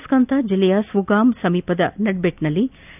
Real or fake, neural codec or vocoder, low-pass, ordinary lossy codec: real; none; 3.6 kHz; none